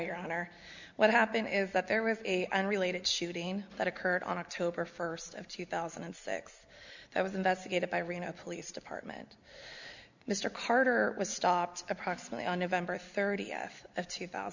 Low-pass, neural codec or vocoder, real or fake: 7.2 kHz; none; real